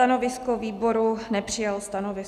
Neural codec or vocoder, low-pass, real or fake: none; 14.4 kHz; real